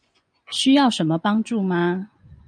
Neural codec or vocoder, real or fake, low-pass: vocoder, 24 kHz, 100 mel bands, Vocos; fake; 9.9 kHz